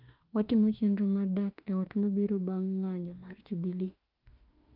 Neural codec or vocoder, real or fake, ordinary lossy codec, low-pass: autoencoder, 48 kHz, 32 numbers a frame, DAC-VAE, trained on Japanese speech; fake; Opus, 32 kbps; 5.4 kHz